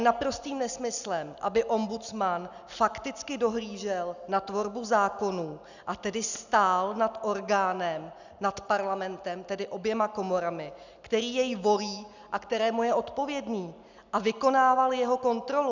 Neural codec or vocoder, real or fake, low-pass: none; real; 7.2 kHz